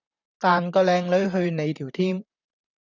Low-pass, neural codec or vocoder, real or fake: 7.2 kHz; vocoder, 44.1 kHz, 128 mel bands, Pupu-Vocoder; fake